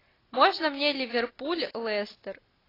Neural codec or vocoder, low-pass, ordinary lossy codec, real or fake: none; 5.4 kHz; AAC, 24 kbps; real